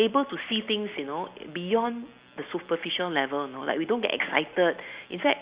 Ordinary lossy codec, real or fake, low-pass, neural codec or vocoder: Opus, 64 kbps; real; 3.6 kHz; none